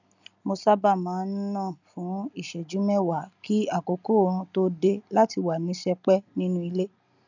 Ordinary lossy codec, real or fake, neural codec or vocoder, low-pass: none; real; none; 7.2 kHz